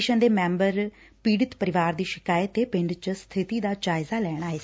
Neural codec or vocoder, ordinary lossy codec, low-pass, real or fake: none; none; none; real